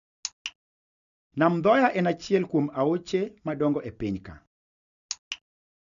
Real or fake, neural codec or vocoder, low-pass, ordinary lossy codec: real; none; 7.2 kHz; none